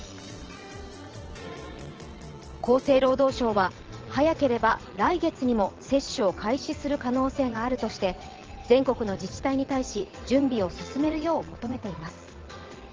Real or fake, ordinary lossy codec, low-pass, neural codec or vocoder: fake; Opus, 16 kbps; 7.2 kHz; vocoder, 22.05 kHz, 80 mel bands, Vocos